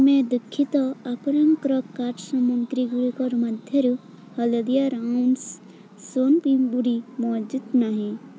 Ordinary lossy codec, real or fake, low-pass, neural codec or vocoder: none; real; none; none